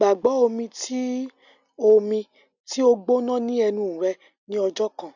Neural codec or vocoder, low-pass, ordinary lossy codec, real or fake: none; 7.2 kHz; none; real